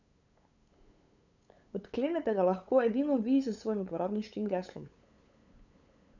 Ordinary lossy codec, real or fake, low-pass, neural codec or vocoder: none; fake; 7.2 kHz; codec, 16 kHz, 8 kbps, FunCodec, trained on LibriTTS, 25 frames a second